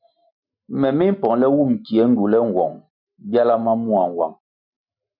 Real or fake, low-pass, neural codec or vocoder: real; 5.4 kHz; none